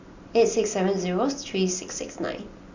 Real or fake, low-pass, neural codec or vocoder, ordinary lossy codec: real; 7.2 kHz; none; Opus, 64 kbps